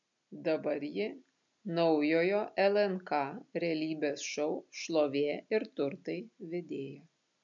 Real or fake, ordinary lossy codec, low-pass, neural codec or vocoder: real; MP3, 64 kbps; 7.2 kHz; none